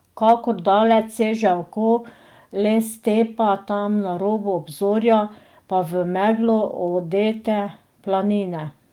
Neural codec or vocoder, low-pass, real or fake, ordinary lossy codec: codec, 44.1 kHz, 7.8 kbps, DAC; 19.8 kHz; fake; Opus, 32 kbps